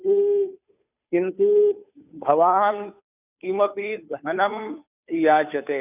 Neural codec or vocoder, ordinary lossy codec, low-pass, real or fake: codec, 16 kHz, 2 kbps, FunCodec, trained on Chinese and English, 25 frames a second; none; 3.6 kHz; fake